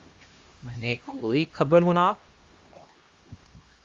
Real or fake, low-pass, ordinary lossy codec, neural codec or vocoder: fake; 7.2 kHz; Opus, 32 kbps; codec, 16 kHz, 1 kbps, X-Codec, HuBERT features, trained on LibriSpeech